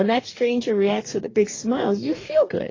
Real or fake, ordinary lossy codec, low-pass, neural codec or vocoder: fake; AAC, 32 kbps; 7.2 kHz; codec, 44.1 kHz, 2.6 kbps, DAC